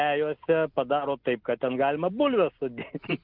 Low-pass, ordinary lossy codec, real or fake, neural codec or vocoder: 5.4 kHz; Opus, 32 kbps; real; none